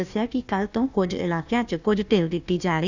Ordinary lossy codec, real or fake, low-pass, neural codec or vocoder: none; fake; 7.2 kHz; codec, 16 kHz, 1 kbps, FunCodec, trained on Chinese and English, 50 frames a second